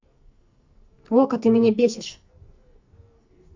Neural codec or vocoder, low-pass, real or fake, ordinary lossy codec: codec, 44.1 kHz, 2.6 kbps, SNAC; 7.2 kHz; fake; MP3, 64 kbps